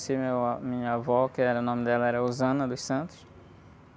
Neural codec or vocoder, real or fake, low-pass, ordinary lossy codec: none; real; none; none